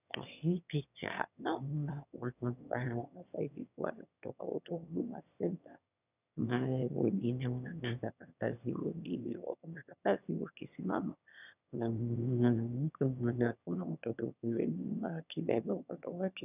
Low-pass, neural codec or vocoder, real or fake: 3.6 kHz; autoencoder, 22.05 kHz, a latent of 192 numbers a frame, VITS, trained on one speaker; fake